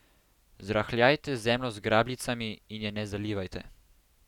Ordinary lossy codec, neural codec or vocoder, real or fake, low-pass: none; none; real; 19.8 kHz